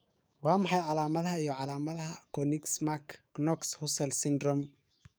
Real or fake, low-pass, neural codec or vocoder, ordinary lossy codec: fake; none; codec, 44.1 kHz, 7.8 kbps, DAC; none